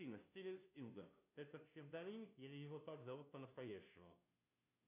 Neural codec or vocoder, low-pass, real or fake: codec, 16 kHz, 0.5 kbps, FunCodec, trained on Chinese and English, 25 frames a second; 3.6 kHz; fake